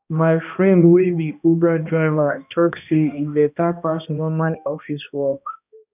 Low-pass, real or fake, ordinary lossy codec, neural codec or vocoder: 3.6 kHz; fake; none; codec, 16 kHz, 1 kbps, X-Codec, HuBERT features, trained on balanced general audio